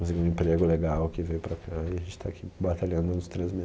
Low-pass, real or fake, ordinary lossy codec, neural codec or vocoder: none; real; none; none